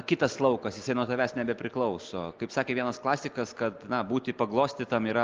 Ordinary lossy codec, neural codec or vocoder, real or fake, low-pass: Opus, 32 kbps; none; real; 7.2 kHz